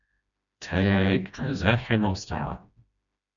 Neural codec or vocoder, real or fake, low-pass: codec, 16 kHz, 1 kbps, FreqCodec, smaller model; fake; 7.2 kHz